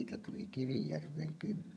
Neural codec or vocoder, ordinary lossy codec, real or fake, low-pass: vocoder, 22.05 kHz, 80 mel bands, HiFi-GAN; none; fake; none